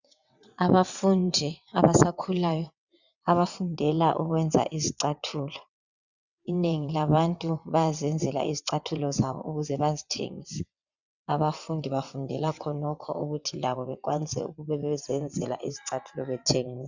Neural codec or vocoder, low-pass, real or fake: vocoder, 22.05 kHz, 80 mel bands, WaveNeXt; 7.2 kHz; fake